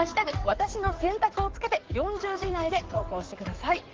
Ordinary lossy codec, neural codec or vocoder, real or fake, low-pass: Opus, 16 kbps; codec, 16 kHz in and 24 kHz out, 2.2 kbps, FireRedTTS-2 codec; fake; 7.2 kHz